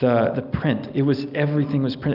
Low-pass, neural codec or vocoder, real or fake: 5.4 kHz; none; real